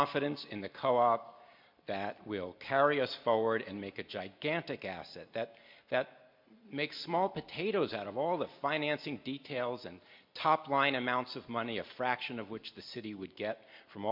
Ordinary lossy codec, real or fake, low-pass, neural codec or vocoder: MP3, 48 kbps; real; 5.4 kHz; none